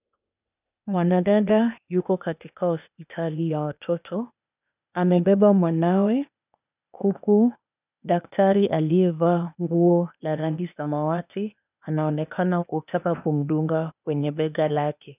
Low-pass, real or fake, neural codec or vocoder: 3.6 kHz; fake; codec, 16 kHz, 0.8 kbps, ZipCodec